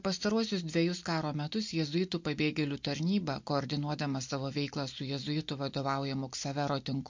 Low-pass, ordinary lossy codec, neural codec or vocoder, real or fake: 7.2 kHz; MP3, 48 kbps; none; real